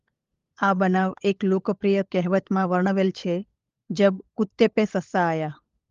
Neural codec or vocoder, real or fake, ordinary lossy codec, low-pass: codec, 16 kHz, 16 kbps, FunCodec, trained on LibriTTS, 50 frames a second; fake; Opus, 32 kbps; 7.2 kHz